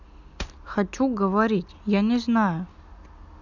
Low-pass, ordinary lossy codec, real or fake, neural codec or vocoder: 7.2 kHz; none; real; none